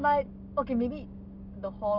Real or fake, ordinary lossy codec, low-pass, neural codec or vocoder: real; none; 5.4 kHz; none